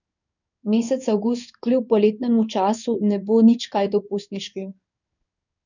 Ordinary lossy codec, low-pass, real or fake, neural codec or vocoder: none; 7.2 kHz; fake; codec, 16 kHz in and 24 kHz out, 1 kbps, XY-Tokenizer